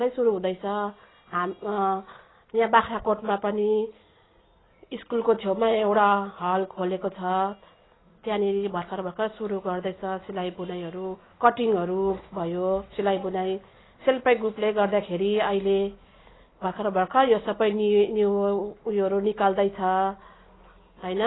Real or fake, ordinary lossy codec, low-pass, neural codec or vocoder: real; AAC, 16 kbps; 7.2 kHz; none